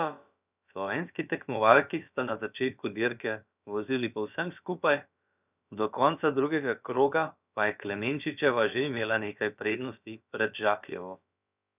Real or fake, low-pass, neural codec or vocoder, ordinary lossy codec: fake; 3.6 kHz; codec, 16 kHz, about 1 kbps, DyCAST, with the encoder's durations; none